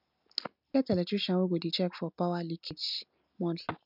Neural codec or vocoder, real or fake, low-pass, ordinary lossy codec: none; real; 5.4 kHz; none